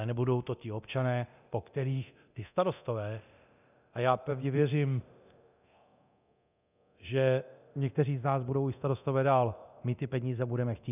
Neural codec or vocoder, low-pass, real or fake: codec, 24 kHz, 0.9 kbps, DualCodec; 3.6 kHz; fake